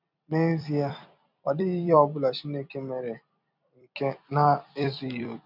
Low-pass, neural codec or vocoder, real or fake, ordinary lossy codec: 5.4 kHz; vocoder, 44.1 kHz, 128 mel bands every 256 samples, BigVGAN v2; fake; none